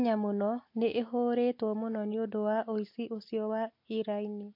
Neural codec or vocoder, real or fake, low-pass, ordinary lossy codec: none; real; 5.4 kHz; MP3, 32 kbps